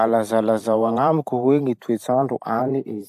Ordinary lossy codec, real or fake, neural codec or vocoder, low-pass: AAC, 96 kbps; fake; vocoder, 44.1 kHz, 128 mel bands every 512 samples, BigVGAN v2; 14.4 kHz